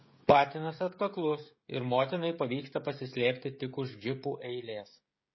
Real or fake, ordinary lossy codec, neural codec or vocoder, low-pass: fake; MP3, 24 kbps; codec, 16 kHz, 16 kbps, FreqCodec, smaller model; 7.2 kHz